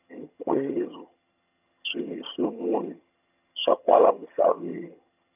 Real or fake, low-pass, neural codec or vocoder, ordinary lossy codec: fake; 3.6 kHz; vocoder, 22.05 kHz, 80 mel bands, HiFi-GAN; none